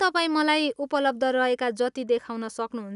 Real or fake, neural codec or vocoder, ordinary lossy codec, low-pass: real; none; none; 10.8 kHz